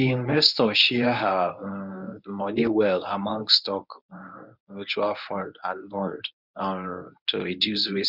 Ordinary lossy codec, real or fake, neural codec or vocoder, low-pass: none; fake; codec, 24 kHz, 0.9 kbps, WavTokenizer, medium speech release version 1; 5.4 kHz